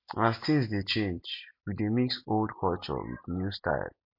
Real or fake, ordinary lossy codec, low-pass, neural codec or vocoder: real; MP3, 32 kbps; 5.4 kHz; none